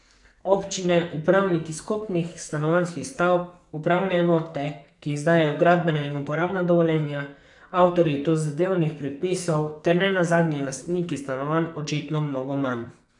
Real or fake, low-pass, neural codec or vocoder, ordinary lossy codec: fake; 10.8 kHz; codec, 44.1 kHz, 2.6 kbps, SNAC; none